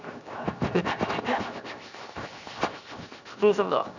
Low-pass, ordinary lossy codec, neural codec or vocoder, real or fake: 7.2 kHz; none; codec, 16 kHz, 0.7 kbps, FocalCodec; fake